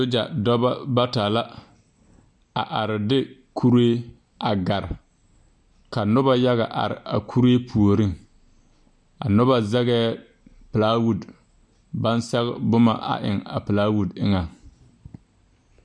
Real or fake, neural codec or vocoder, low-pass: real; none; 9.9 kHz